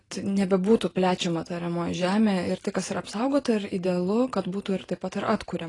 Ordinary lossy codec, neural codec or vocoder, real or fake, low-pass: AAC, 32 kbps; none; real; 10.8 kHz